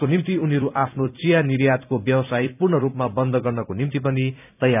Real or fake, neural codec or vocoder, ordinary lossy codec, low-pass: fake; vocoder, 44.1 kHz, 128 mel bands every 256 samples, BigVGAN v2; none; 3.6 kHz